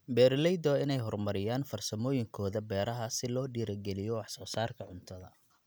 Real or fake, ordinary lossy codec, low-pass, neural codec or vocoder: real; none; none; none